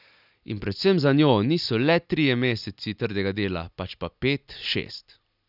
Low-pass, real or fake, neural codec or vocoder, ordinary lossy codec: 5.4 kHz; real; none; none